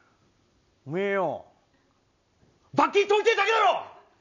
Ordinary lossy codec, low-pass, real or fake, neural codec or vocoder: none; 7.2 kHz; real; none